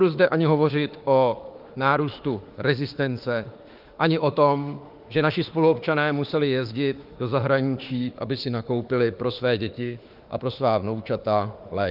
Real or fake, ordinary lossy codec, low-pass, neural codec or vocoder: fake; Opus, 32 kbps; 5.4 kHz; autoencoder, 48 kHz, 32 numbers a frame, DAC-VAE, trained on Japanese speech